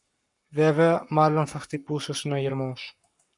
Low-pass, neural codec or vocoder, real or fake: 10.8 kHz; codec, 44.1 kHz, 7.8 kbps, Pupu-Codec; fake